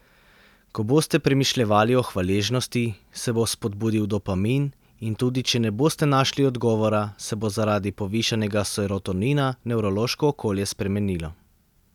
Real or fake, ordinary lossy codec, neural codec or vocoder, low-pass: real; none; none; 19.8 kHz